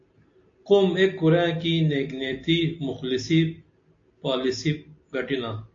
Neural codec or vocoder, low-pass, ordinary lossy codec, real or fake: none; 7.2 kHz; AAC, 48 kbps; real